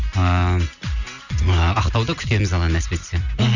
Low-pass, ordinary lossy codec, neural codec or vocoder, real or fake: 7.2 kHz; none; none; real